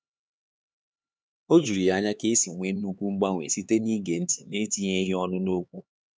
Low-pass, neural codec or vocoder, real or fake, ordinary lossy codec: none; codec, 16 kHz, 4 kbps, X-Codec, HuBERT features, trained on LibriSpeech; fake; none